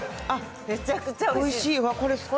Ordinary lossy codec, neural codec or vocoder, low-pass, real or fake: none; none; none; real